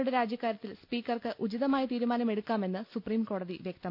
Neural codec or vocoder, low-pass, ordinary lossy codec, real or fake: none; 5.4 kHz; none; real